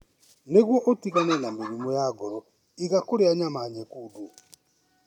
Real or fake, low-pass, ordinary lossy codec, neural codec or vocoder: real; 19.8 kHz; none; none